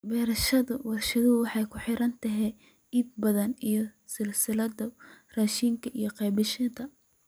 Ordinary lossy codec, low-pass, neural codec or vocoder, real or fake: none; none; none; real